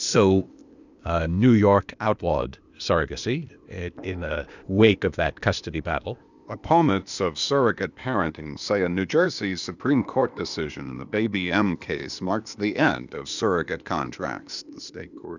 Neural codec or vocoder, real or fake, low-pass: codec, 16 kHz, 0.8 kbps, ZipCodec; fake; 7.2 kHz